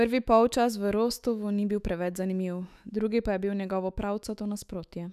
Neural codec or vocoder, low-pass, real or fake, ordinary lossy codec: autoencoder, 48 kHz, 128 numbers a frame, DAC-VAE, trained on Japanese speech; 14.4 kHz; fake; none